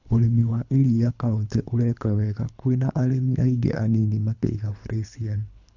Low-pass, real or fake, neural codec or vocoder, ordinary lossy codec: 7.2 kHz; fake; codec, 24 kHz, 3 kbps, HILCodec; none